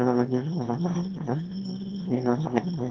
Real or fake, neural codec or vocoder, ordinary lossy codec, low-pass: fake; autoencoder, 22.05 kHz, a latent of 192 numbers a frame, VITS, trained on one speaker; Opus, 16 kbps; 7.2 kHz